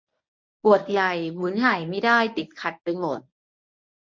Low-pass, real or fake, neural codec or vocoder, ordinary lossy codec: 7.2 kHz; fake; codec, 24 kHz, 0.9 kbps, WavTokenizer, medium speech release version 1; MP3, 48 kbps